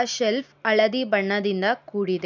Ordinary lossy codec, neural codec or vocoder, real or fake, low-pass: none; none; real; 7.2 kHz